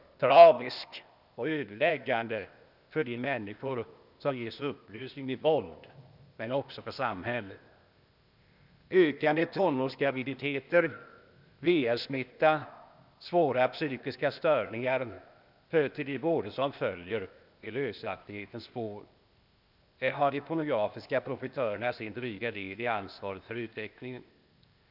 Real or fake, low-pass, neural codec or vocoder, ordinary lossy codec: fake; 5.4 kHz; codec, 16 kHz, 0.8 kbps, ZipCodec; none